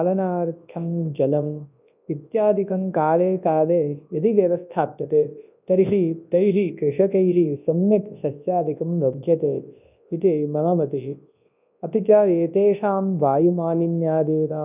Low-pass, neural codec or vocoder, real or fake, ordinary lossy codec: 3.6 kHz; codec, 24 kHz, 0.9 kbps, WavTokenizer, large speech release; fake; none